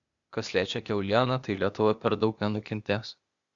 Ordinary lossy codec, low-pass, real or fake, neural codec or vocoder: Opus, 64 kbps; 7.2 kHz; fake; codec, 16 kHz, 0.8 kbps, ZipCodec